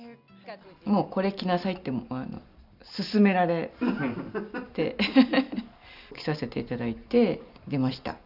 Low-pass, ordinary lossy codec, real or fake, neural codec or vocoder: 5.4 kHz; none; real; none